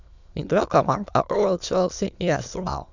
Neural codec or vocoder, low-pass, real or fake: autoencoder, 22.05 kHz, a latent of 192 numbers a frame, VITS, trained on many speakers; 7.2 kHz; fake